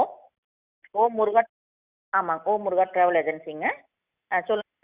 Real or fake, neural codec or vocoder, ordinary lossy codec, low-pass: real; none; Opus, 64 kbps; 3.6 kHz